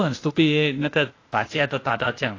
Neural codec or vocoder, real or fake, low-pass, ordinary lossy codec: codec, 16 kHz, 0.8 kbps, ZipCodec; fake; 7.2 kHz; AAC, 32 kbps